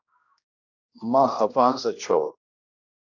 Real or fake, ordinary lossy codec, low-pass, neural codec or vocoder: fake; AAC, 48 kbps; 7.2 kHz; codec, 16 kHz, 1 kbps, X-Codec, HuBERT features, trained on general audio